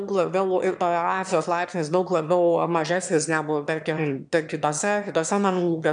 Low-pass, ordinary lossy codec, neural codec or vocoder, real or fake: 9.9 kHz; MP3, 96 kbps; autoencoder, 22.05 kHz, a latent of 192 numbers a frame, VITS, trained on one speaker; fake